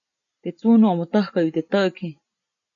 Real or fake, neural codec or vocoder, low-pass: real; none; 7.2 kHz